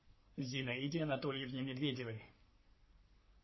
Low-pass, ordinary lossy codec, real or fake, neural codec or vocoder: 7.2 kHz; MP3, 24 kbps; fake; codec, 44.1 kHz, 3.4 kbps, Pupu-Codec